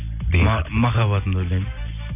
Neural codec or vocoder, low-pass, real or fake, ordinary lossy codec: none; 3.6 kHz; real; none